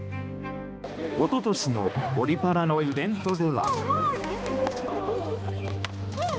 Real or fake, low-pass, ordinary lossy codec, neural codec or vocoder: fake; none; none; codec, 16 kHz, 2 kbps, X-Codec, HuBERT features, trained on balanced general audio